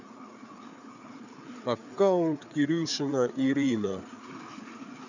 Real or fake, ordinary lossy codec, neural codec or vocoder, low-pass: fake; none; codec, 16 kHz, 4 kbps, FreqCodec, larger model; 7.2 kHz